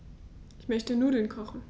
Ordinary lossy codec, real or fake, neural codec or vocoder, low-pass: none; real; none; none